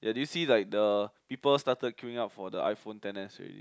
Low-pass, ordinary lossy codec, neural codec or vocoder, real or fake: none; none; none; real